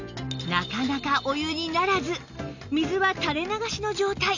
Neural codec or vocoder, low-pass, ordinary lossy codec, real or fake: none; 7.2 kHz; none; real